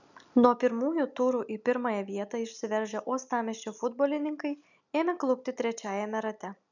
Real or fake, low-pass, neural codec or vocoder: real; 7.2 kHz; none